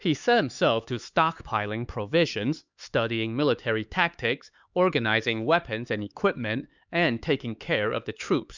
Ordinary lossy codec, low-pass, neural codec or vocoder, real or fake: Opus, 64 kbps; 7.2 kHz; codec, 16 kHz, 4 kbps, X-Codec, HuBERT features, trained on LibriSpeech; fake